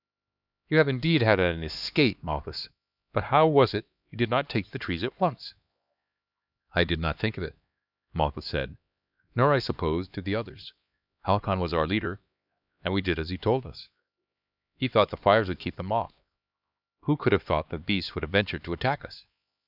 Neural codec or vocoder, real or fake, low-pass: codec, 16 kHz, 2 kbps, X-Codec, HuBERT features, trained on LibriSpeech; fake; 5.4 kHz